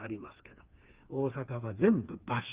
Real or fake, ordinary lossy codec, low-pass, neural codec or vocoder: fake; Opus, 32 kbps; 3.6 kHz; codec, 16 kHz, 4 kbps, FreqCodec, smaller model